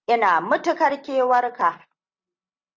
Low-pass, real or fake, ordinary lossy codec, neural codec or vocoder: 7.2 kHz; real; Opus, 32 kbps; none